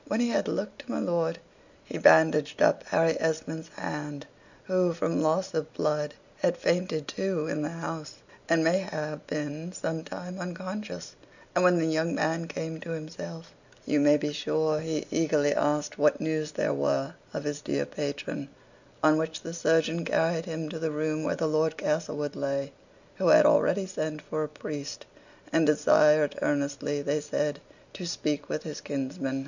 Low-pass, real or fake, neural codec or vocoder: 7.2 kHz; real; none